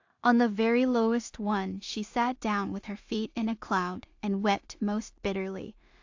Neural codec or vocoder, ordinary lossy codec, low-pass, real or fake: codec, 16 kHz in and 24 kHz out, 0.4 kbps, LongCat-Audio-Codec, two codebook decoder; AAC, 48 kbps; 7.2 kHz; fake